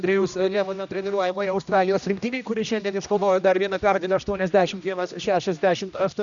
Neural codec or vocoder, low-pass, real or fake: codec, 16 kHz, 1 kbps, X-Codec, HuBERT features, trained on general audio; 7.2 kHz; fake